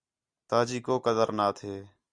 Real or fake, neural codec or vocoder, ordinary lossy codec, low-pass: real; none; Opus, 64 kbps; 9.9 kHz